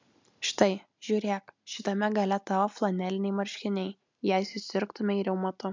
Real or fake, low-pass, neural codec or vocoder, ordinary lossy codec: real; 7.2 kHz; none; MP3, 64 kbps